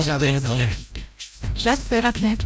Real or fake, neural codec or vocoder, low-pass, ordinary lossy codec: fake; codec, 16 kHz, 0.5 kbps, FreqCodec, larger model; none; none